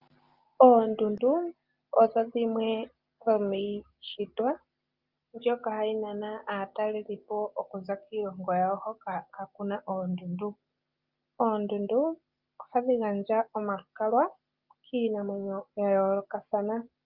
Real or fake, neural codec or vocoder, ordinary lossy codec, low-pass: real; none; Opus, 24 kbps; 5.4 kHz